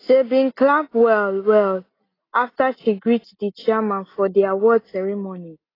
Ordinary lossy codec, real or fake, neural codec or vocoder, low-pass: AAC, 24 kbps; real; none; 5.4 kHz